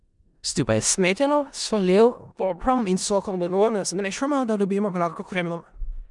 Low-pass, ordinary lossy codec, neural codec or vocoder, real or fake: 10.8 kHz; none; codec, 16 kHz in and 24 kHz out, 0.4 kbps, LongCat-Audio-Codec, four codebook decoder; fake